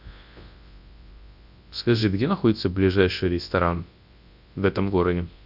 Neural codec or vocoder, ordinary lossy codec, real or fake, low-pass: codec, 24 kHz, 0.9 kbps, WavTokenizer, large speech release; Opus, 64 kbps; fake; 5.4 kHz